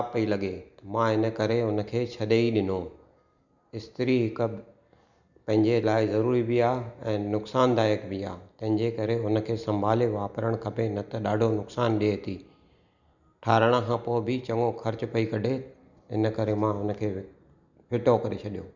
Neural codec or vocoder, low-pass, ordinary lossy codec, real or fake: none; 7.2 kHz; none; real